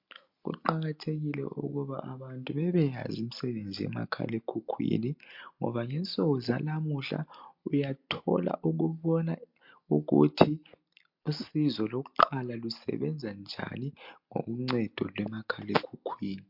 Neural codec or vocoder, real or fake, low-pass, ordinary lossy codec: none; real; 5.4 kHz; MP3, 48 kbps